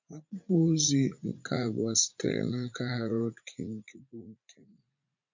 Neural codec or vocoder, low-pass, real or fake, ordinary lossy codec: vocoder, 44.1 kHz, 80 mel bands, Vocos; 7.2 kHz; fake; MP3, 48 kbps